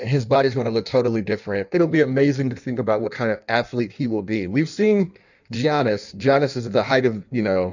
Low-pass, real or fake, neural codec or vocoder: 7.2 kHz; fake; codec, 16 kHz in and 24 kHz out, 1.1 kbps, FireRedTTS-2 codec